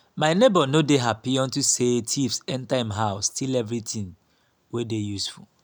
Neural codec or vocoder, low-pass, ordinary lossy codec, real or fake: none; none; none; real